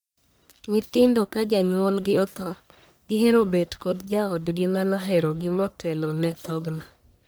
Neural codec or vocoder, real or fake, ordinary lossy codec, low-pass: codec, 44.1 kHz, 1.7 kbps, Pupu-Codec; fake; none; none